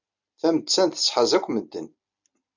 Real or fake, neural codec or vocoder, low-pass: real; none; 7.2 kHz